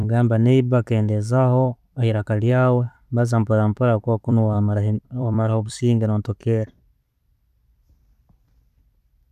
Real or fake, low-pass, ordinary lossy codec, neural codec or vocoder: fake; 14.4 kHz; none; vocoder, 44.1 kHz, 128 mel bands every 256 samples, BigVGAN v2